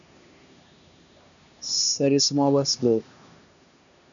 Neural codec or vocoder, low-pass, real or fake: codec, 16 kHz, 2 kbps, X-Codec, HuBERT features, trained on LibriSpeech; 7.2 kHz; fake